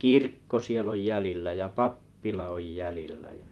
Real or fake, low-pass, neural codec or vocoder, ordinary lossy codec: fake; 19.8 kHz; vocoder, 44.1 kHz, 128 mel bands, Pupu-Vocoder; Opus, 32 kbps